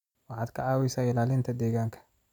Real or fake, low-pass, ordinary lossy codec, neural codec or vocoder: fake; 19.8 kHz; none; vocoder, 44.1 kHz, 128 mel bands every 256 samples, BigVGAN v2